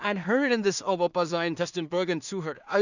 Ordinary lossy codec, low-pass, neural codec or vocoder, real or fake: none; 7.2 kHz; codec, 16 kHz in and 24 kHz out, 0.4 kbps, LongCat-Audio-Codec, two codebook decoder; fake